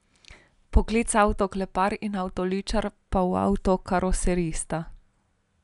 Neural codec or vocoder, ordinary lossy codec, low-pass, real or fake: none; none; 10.8 kHz; real